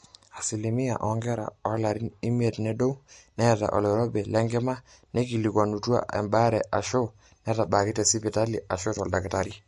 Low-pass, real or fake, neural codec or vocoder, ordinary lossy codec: 14.4 kHz; real; none; MP3, 48 kbps